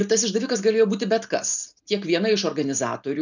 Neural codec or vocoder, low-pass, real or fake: none; 7.2 kHz; real